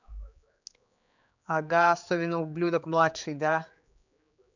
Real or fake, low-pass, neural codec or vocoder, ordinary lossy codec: fake; 7.2 kHz; codec, 16 kHz, 4 kbps, X-Codec, HuBERT features, trained on general audio; none